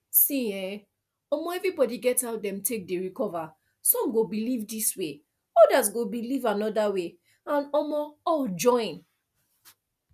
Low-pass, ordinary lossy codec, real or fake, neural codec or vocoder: 14.4 kHz; none; real; none